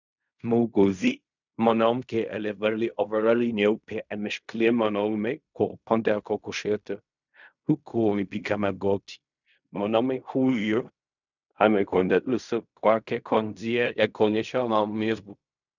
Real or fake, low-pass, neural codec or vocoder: fake; 7.2 kHz; codec, 16 kHz in and 24 kHz out, 0.4 kbps, LongCat-Audio-Codec, fine tuned four codebook decoder